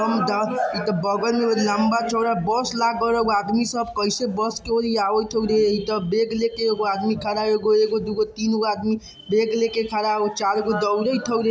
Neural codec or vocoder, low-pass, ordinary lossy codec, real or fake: none; none; none; real